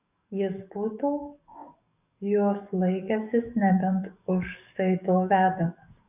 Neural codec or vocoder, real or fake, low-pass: codec, 44.1 kHz, 7.8 kbps, DAC; fake; 3.6 kHz